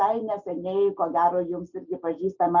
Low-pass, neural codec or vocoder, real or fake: 7.2 kHz; none; real